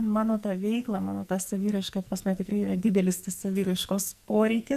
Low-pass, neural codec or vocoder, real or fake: 14.4 kHz; codec, 44.1 kHz, 2.6 kbps, SNAC; fake